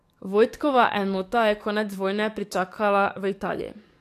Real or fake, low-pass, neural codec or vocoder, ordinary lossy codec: fake; 14.4 kHz; codec, 44.1 kHz, 7.8 kbps, DAC; AAC, 64 kbps